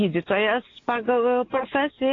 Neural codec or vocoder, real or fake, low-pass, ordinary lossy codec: none; real; 7.2 kHz; AAC, 32 kbps